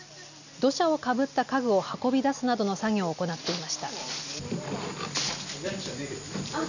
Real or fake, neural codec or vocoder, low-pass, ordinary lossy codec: real; none; 7.2 kHz; none